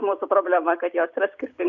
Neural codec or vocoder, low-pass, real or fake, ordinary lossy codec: none; 7.2 kHz; real; MP3, 96 kbps